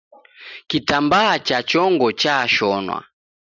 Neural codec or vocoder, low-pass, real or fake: none; 7.2 kHz; real